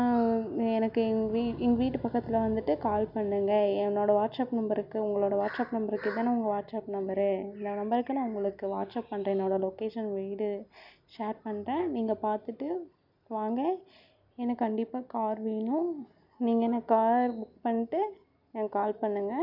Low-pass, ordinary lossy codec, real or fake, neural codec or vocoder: 5.4 kHz; none; real; none